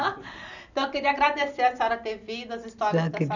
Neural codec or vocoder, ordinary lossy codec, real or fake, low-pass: none; none; real; 7.2 kHz